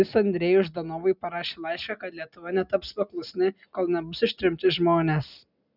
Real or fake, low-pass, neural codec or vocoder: real; 5.4 kHz; none